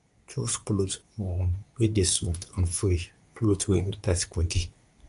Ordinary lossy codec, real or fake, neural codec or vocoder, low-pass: none; fake; codec, 24 kHz, 0.9 kbps, WavTokenizer, medium speech release version 2; 10.8 kHz